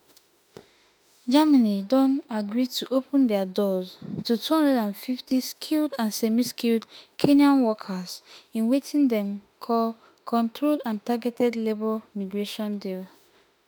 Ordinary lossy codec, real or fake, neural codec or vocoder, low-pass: none; fake; autoencoder, 48 kHz, 32 numbers a frame, DAC-VAE, trained on Japanese speech; none